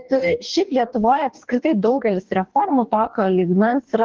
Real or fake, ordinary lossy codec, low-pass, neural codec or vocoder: fake; Opus, 16 kbps; 7.2 kHz; codec, 44.1 kHz, 2.6 kbps, DAC